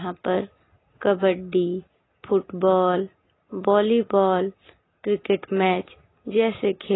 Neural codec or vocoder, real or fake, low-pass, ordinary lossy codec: none; real; 7.2 kHz; AAC, 16 kbps